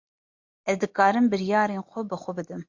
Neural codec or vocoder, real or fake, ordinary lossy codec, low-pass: none; real; MP3, 48 kbps; 7.2 kHz